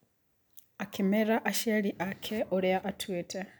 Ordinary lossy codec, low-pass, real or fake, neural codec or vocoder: none; none; real; none